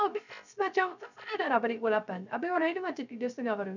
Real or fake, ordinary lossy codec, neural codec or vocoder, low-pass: fake; none; codec, 16 kHz, 0.3 kbps, FocalCodec; 7.2 kHz